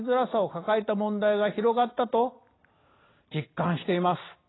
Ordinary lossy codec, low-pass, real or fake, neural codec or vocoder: AAC, 16 kbps; 7.2 kHz; fake; vocoder, 44.1 kHz, 128 mel bands every 256 samples, BigVGAN v2